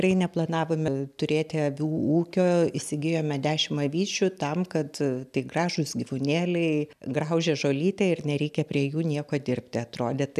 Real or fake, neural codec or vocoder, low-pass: real; none; 14.4 kHz